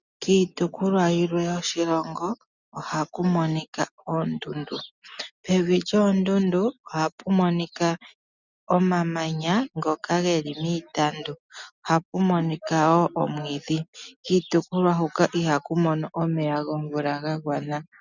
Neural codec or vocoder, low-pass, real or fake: none; 7.2 kHz; real